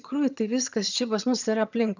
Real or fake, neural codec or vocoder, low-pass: fake; vocoder, 22.05 kHz, 80 mel bands, HiFi-GAN; 7.2 kHz